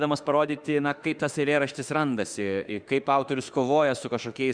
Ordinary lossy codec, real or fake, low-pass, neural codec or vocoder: Opus, 64 kbps; fake; 9.9 kHz; autoencoder, 48 kHz, 32 numbers a frame, DAC-VAE, trained on Japanese speech